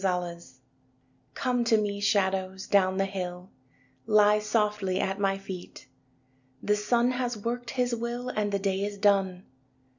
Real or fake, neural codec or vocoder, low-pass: real; none; 7.2 kHz